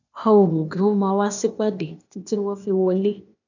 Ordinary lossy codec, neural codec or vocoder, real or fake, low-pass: none; codec, 16 kHz, 0.8 kbps, ZipCodec; fake; 7.2 kHz